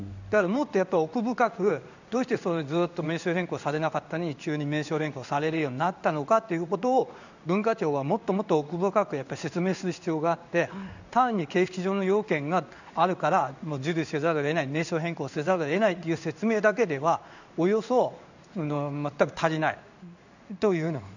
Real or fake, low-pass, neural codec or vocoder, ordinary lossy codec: fake; 7.2 kHz; codec, 16 kHz in and 24 kHz out, 1 kbps, XY-Tokenizer; none